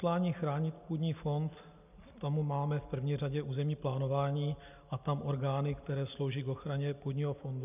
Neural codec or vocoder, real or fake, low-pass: none; real; 3.6 kHz